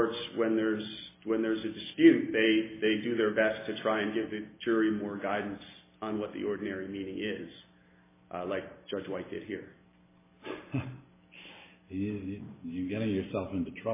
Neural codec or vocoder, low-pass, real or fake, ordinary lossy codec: none; 3.6 kHz; real; MP3, 16 kbps